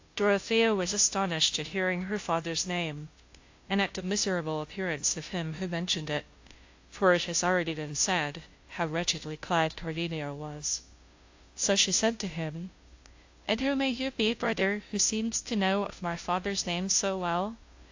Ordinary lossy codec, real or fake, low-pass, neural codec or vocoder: AAC, 48 kbps; fake; 7.2 kHz; codec, 16 kHz, 0.5 kbps, FunCodec, trained on Chinese and English, 25 frames a second